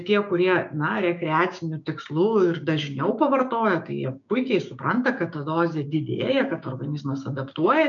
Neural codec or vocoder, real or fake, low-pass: codec, 16 kHz, 6 kbps, DAC; fake; 7.2 kHz